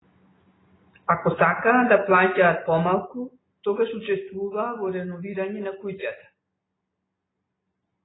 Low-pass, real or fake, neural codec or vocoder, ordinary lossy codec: 7.2 kHz; real; none; AAC, 16 kbps